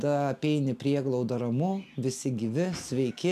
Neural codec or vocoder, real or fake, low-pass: none; real; 14.4 kHz